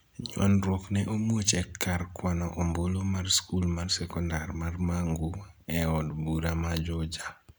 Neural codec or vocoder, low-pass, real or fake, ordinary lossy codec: none; none; real; none